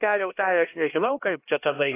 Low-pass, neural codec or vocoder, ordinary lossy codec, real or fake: 3.6 kHz; codec, 16 kHz, 1 kbps, X-Codec, HuBERT features, trained on LibriSpeech; AAC, 24 kbps; fake